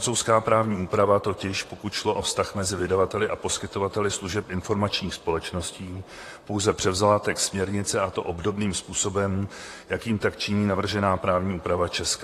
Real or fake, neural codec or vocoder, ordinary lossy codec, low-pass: fake; vocoder, 44.1 kHz, 128 mel bands, Pupu-Vocoder; AAC, 48 kbps; 14.4 kHz